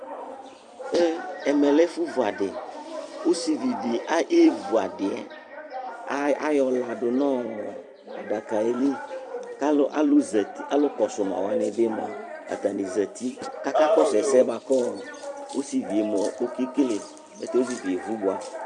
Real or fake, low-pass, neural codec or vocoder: fake; 10.8 kHz; vocoder, 44.1 kHz, 128 mel bands every 256 samples, BigVGAN v2